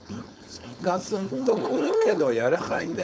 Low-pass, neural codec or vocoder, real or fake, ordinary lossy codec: none; codec, 16 kHz, 4.8 kbps, FACodec; fake; none